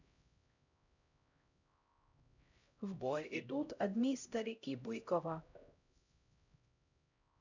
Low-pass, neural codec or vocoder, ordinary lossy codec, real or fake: 7.2 kHz; codec, 16 kHz, 0.5 kbps, X-Codec, HuBERT features, trained on LibriSpeech; none; fake